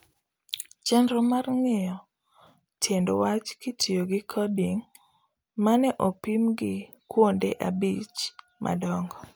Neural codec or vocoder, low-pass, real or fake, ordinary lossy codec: none; none; real; none